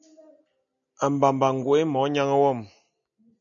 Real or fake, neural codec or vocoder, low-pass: real; none; 7.2 kHz